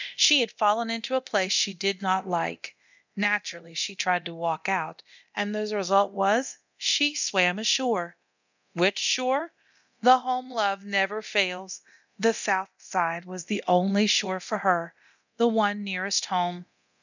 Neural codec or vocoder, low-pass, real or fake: codec, 24 kHz, 0.9 kbps, DualCodec; 7.2 kHz; fake